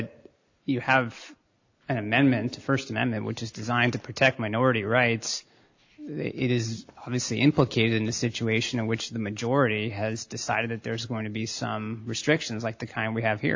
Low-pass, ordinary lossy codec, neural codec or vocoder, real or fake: 7.2 kHz; AAC, 48 kbps; none; real